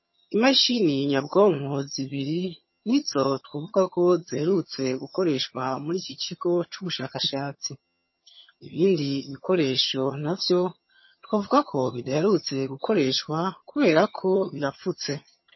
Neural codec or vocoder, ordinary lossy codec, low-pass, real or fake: vocoder, 22.05 kHz, 80 mel bands, HiFi-GAN; MP3, 24 kbps; 7.2 kHz; fake